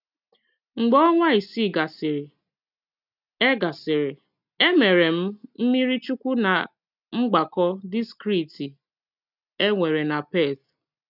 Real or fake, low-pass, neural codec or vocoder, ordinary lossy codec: real; 5.4 kHz; none; none